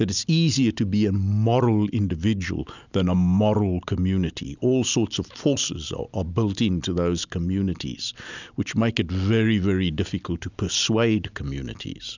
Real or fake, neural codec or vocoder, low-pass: real; none; 7.2 kHz